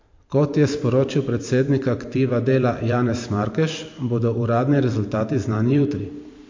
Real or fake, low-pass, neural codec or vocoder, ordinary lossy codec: fake; 7.2 kHz; vocoder, 44.1 kHz, 128 mel bands every 512 samples, BigVGAN v2; MP3, 48 kbps